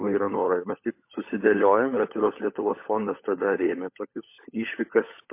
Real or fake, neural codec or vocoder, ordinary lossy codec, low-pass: fake; codec, 16 kHz, 16 kbps, FunCodec, trained on LibriTTS, 50 frames a second; MP3, 24 kbps; 3.6 kHz